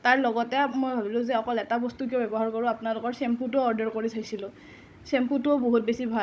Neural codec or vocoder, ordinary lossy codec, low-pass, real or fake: codec, 16 kHz, 16 kbps, FunCodec, trained on Chinese and English, 50 frames a second; none; none; fake